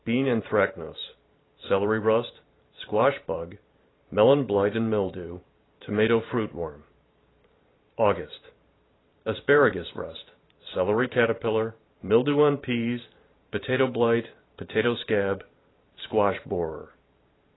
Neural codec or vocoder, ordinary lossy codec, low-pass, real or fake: vocoder, 44.1 kHz, 128 mel bands every 512 samples, BigVGAN v2; AAC, 16 kbps; 7.2 kHz; fake